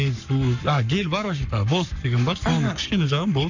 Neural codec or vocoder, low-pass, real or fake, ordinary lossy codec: codec, 44.1 kHz, 7.8 kbps, Pupu-Codec; 7.2 kHz; fake; none